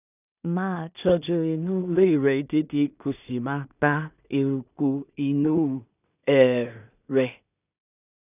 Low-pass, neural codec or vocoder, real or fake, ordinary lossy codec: 3.6 kHz; codec, 16 kHz in and 24 kHz out, 0.4 kbps, LongCat-Audio-Codec, two codebook decoder; fake; none